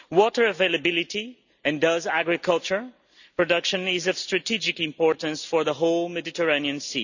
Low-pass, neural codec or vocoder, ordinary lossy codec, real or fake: 7.2 kHz; none; none; real